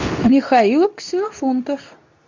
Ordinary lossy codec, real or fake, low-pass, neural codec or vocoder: MP3, 64 kbps; fake; 7.2 kHz; codec, 24 kHz, 0.9 kbps, WavTokenizer, medium speech release version 2